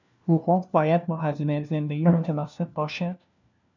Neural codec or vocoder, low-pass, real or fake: codec, 16 kHz, 1 kbps, FunCodec, trained on LibriTTS, 50 frames a second; 7.2 kHz; fake